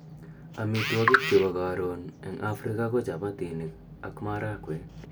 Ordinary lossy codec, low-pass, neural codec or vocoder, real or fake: none; none; none; real